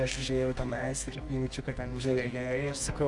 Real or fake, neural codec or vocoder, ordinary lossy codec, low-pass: fake; codec, 24 kHz, 0.9 kbps, WavTokenizer, medium music audio release; Opus, 64 kbps; 10.8 kHz